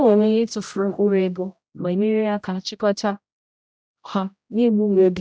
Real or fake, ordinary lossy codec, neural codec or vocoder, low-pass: fake; none; codec, 16 kHz, 0.5 kbps, X-Codec, HuBERT features, trained on general audio; none